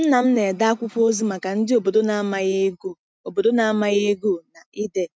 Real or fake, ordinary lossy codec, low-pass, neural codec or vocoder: real; none; none; none